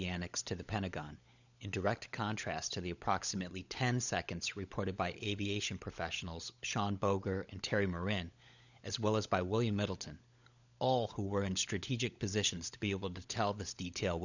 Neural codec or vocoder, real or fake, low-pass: codec, 16 kHz, 16 kbps, FunCodec, trained on LibriTTS, 50 frames a second; fake; 7.2 kHz